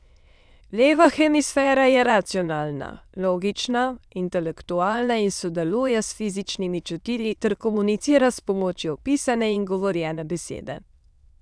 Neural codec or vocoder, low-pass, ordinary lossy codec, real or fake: autoencoder, 22.05 kHz, a latent of 192 numbers a frame, VITS, trained on many speakers; none; none; fake